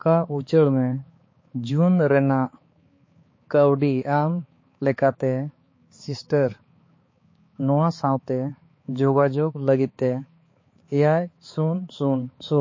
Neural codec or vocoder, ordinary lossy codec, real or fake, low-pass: codec, 16 kHz, 4 kbps, X-Codec, HuBERT features, trained on balanced general audio; MP3, 32 kbps; fake; 7.2 kHz